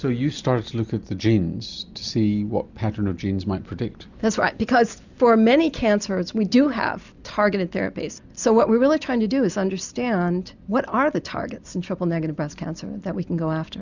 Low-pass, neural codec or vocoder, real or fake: 7.2 kHz; none; real